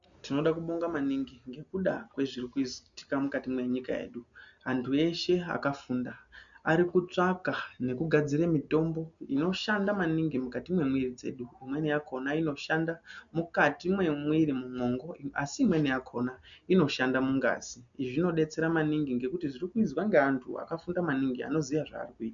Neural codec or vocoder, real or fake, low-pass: none; real; 7.2 kHz